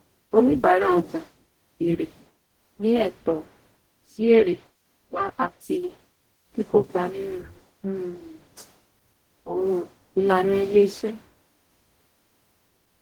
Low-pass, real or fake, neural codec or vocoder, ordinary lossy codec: 19.8 kHz; fake; codec, 44.1 kHz, 0.9 kbps, DAC; Opus, 16 kbps